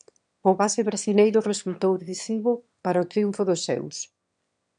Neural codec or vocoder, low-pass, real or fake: autoencoder, 22.05 kHz, a latent of 192 numbers a frame, VITS, trained on one speaker; 9.9 kHz; fake